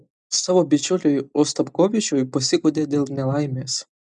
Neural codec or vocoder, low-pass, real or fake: none; 10.8 kHz; real